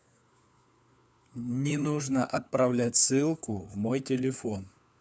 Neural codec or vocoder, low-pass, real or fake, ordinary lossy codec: codec, 16 kHz, 4 kbps, FunCodec, trained on LibriTTS, 50 frames a second; none; fake; none